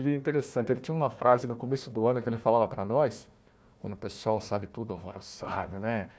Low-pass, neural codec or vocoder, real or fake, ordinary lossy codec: none; codec, 16 kHz, 1 kbps, FunCodec, trained on Chinese and English, 50 frames a second; fake; none